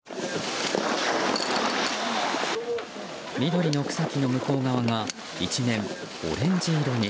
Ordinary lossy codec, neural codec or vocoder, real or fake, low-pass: none; none; real; none